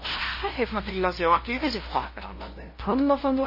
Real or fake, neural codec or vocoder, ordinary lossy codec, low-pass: fake; codec, 16 kHz, 0.5 kbps, FunCodec, trained on LibriTTS, 25 frames a second; MP3, 24 kbps; 5.4 kHz